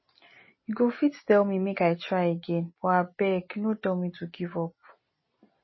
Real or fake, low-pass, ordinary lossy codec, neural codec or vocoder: real; 7.2 kHz; MP3, 24 kbps; none